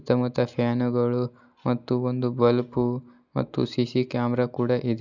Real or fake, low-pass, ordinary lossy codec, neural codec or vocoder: real; 7.2 kHz; none; none